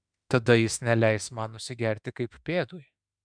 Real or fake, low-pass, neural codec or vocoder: fake; 9.9 kHz; autoencoder, 48 kHz, 32 numbers a frame, DAC-VAE, trained on Japanese speech